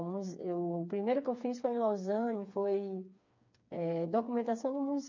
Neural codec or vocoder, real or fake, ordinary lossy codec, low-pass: codec, 16 kHz, 4 kbps, FreqCodec, smaller model; fake; MP3, 48 kbps; 7.2 kHz